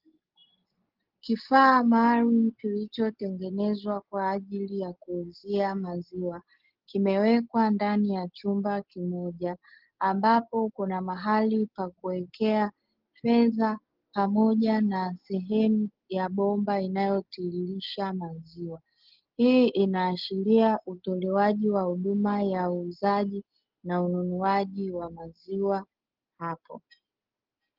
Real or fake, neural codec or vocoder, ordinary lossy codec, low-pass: real; none; Opus, 16 kbps; 5.4 kHz